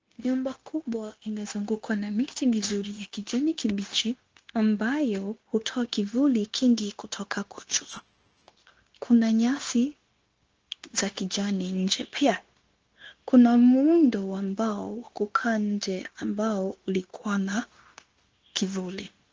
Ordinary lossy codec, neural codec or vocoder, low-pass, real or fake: Opus, 16 kbps; codec, 16 kHz, 0.9 kbps, LongCat-Audio-Codec; 7.2 kHz; fake